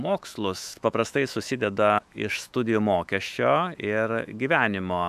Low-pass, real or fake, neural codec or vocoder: 14.4 kHz; fake; autoencoder, 48 kHz, 128 numbers a frame, DAC-VAE, trained on Japanese speech